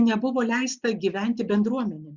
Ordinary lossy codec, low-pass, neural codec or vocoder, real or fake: Opus, 64 kbps; 7.2 kHz; none; real